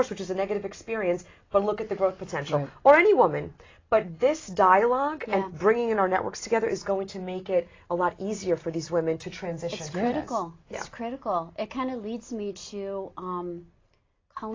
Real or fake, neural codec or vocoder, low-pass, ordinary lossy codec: real; none; 7.2 kHz; AAC, 32 kbps